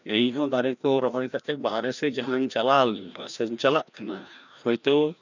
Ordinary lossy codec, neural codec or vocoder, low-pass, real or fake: none; codec, 16 kHz, 1 kbps, FreqCodec, larger model; 7.2 kHz; fake